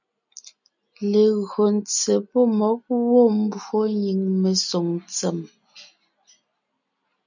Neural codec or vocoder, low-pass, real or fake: none; 7.2 kHz; real